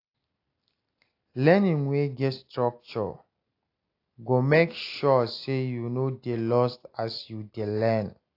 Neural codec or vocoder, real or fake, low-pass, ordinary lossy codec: none; real; 5.4 kHz; AAC, 32 kbps